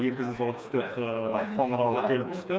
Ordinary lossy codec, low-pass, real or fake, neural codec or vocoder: none; none; fake; codec, 16 kHz, 2 kbps, FreqCodec, smaller model